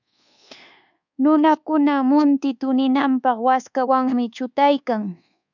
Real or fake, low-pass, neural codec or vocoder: fake; 7.2 kHz; codec, 24 kHz, 1.2 kbps, DualCodec